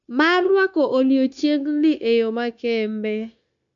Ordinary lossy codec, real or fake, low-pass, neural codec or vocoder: none; fake; 7.2 kHz; codec, 16 kHz, 0.9 kbps, LongCat-Audio-Codec